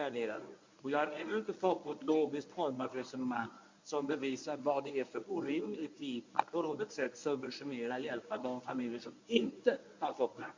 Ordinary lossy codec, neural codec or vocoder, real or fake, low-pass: MP3, 48 kbps; codec, 24 kHz, 0.9 kbps, WavTokenizer, medium speech release version 1; fake; 7.2 kHz